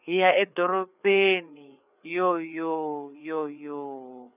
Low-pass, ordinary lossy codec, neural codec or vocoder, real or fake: 3.6 kHz; none; codec, 16 kHz, 4 kbps, FreqCodec, larger model; fake